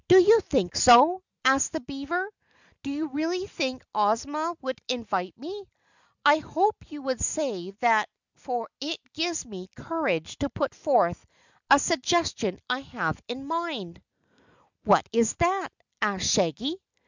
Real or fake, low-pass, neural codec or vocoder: real; 7.2 kHz; none